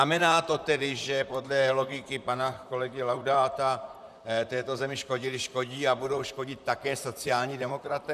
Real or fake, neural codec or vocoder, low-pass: fake; vocoder, 44.1 kHz, 128 mel bands, Pupu-Vocoder; 14.4 kHz